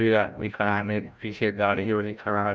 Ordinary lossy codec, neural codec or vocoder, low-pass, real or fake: none; codec, 16 kHz, 0.5 kbps, FreqCodec, larger model; none; fake